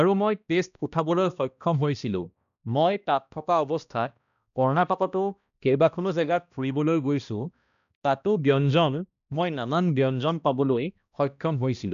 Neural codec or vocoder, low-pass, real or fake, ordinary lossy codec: codec, 16 kHz, 1 kbps, X-Codec, HuBERT features, trained on balanced general audio; 7.2 kHz; fake; none